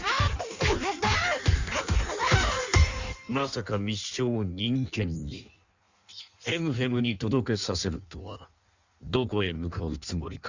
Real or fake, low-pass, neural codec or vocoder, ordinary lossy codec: fake; 7.2 kHz; codec, 16 kHz in and 24 kHz out, 1.1 kbps, FireRedTTS-2 codec; Opus, 64 kbps